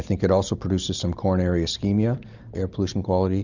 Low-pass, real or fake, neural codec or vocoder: 7.2 kHz; real; none